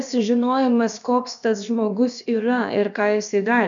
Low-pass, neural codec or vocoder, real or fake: 7.2 kHz; codec, 16 kHz, about 1 kbps, DyCAST, with the encoder's durations; fake